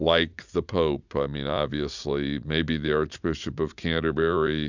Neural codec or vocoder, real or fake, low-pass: vocoder, 44.1 kHz, 80 mel bands, Vocos; fake; 7.2 kHz